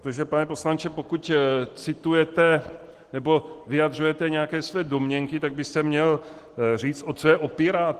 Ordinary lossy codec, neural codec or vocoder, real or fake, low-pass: Opus, 16 kbps; none; real; 14.4 kHz